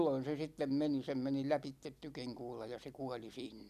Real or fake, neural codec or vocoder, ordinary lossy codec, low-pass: real; none; none; 14.4 kHz